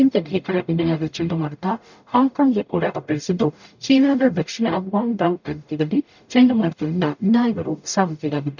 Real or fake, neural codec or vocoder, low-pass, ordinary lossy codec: fake; codec, 44.1 kHz, 0.9 kbps, DAC; 7.2 kHz; none